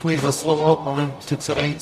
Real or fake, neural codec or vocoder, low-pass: fake; codec, 44.1 kHz, 0.9 kbps, DAC; 14.4 kHz